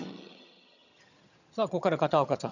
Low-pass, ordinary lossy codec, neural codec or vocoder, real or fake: 7.2 kHz; none; vocoder, 22.05 kHz, 80 mel bands, HiFi-GAN; fake